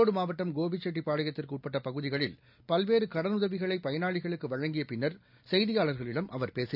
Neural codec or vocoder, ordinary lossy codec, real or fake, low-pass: none; none; real; 5.4 kHz